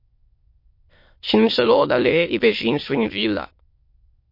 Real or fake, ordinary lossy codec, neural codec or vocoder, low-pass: fake; MP3, 32 kbps; autoencoder, 22.05 kHz, a latent of 192 numbers a frame, VITS, trained on many speakers; 5.4 kHz